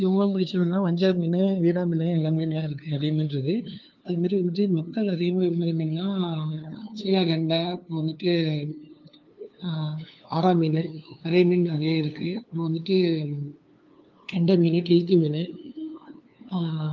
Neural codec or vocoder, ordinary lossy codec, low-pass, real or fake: codec, 16 kHz, 2 kbps, FunCodec, trained on LibriTTS, 25 frames a second; Opus, 24 kbps; 7.2 kHz; fake